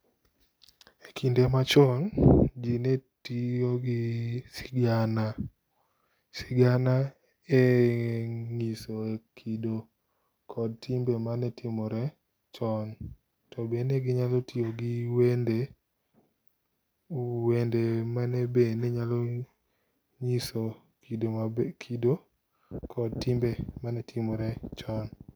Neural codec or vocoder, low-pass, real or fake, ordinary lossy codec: none; none; real; none